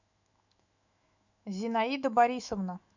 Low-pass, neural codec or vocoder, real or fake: 7.2 kHz; autoencoder, 48 kHz, 128 numbers a frame, DAC-VAE, trained on Japanese speech; fake